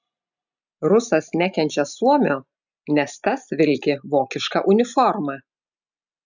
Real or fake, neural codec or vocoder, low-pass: real; none; 7.2 kHz